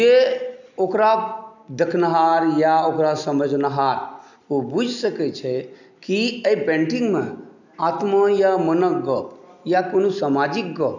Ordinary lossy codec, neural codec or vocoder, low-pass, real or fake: none; none; 7.2 kHz; real